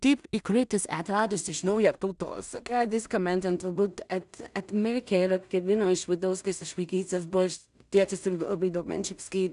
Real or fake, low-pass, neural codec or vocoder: fake; 10.8 kHz; codec, 16 kHz in and 24 kHz out, 0.4 kbps, LongCat-Audio-Codec, two codebook decoder